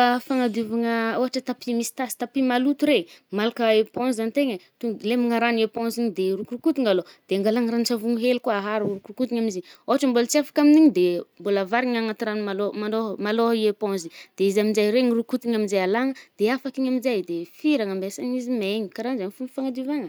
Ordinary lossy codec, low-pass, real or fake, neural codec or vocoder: none; none; real; none